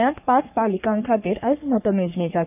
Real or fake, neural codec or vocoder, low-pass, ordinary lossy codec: fake; codec, 44.1 kHz, 3.4 kbps, Pupu-Codec; 3.6 kHz; none